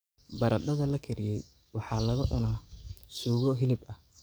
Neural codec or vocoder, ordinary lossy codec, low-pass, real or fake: codec, 44.1 kHz, 7.8 kbps, DAC; none; none; fake